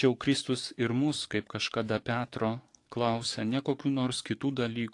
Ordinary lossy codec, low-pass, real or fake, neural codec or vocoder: AAC, 48 kbps; 10.8 kHz; fake; codec, 44.1 kHz, 7.8 kbps, DAC